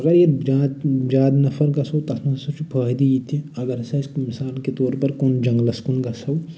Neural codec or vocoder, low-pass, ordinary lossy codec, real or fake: none; none; none; real